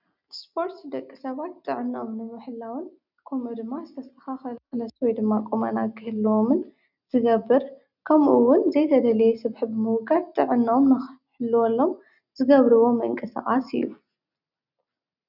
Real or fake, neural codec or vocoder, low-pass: real; none; 5.4 kHz